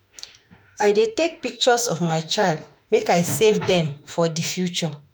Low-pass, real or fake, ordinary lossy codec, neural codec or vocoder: none; fake; none; autoencoder, 48 kHz, 32 numbers a frame, DAC-VAE, trained on Japanese speech